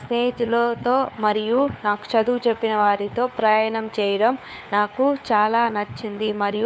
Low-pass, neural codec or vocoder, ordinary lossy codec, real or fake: none; codec, 16 kHz, 4 kbps, FunCodec, trained on LibriTTS, 50 frames a second; none; fake